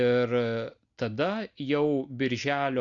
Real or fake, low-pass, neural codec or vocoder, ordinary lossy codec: real; 7.2 kHz; none; Opus, 64 kbps